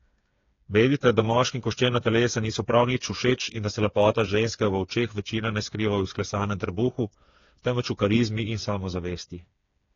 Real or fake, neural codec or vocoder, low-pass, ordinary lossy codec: fake; codec, 16 kHz, 4 kbps, FreqCodec, smaller model; 7.2 kHz; AAC, 32 kbps